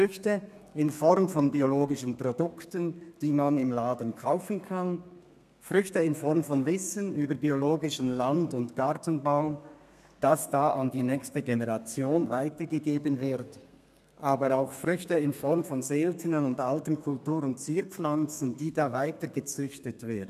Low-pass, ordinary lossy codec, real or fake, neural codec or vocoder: 14.4 kHz; none; fake; codec, 32 kHz, 1.9 kbps, SNAC